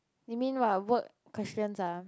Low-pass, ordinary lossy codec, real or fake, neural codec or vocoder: none; none; real; none